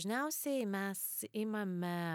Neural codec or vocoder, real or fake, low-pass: none; real; 19.8 kHz